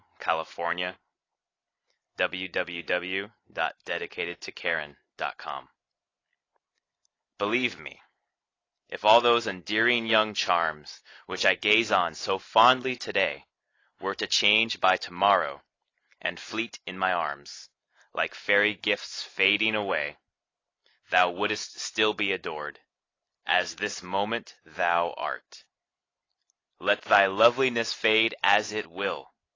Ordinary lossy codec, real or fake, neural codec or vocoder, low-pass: AAC, 32 kbps; real; none; 7.2 kHz